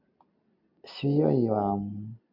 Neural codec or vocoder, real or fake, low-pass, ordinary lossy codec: none; real; 5.4 kHz; MP3, 48 kbps